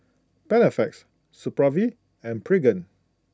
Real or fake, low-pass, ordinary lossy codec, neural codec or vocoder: real; none; none; none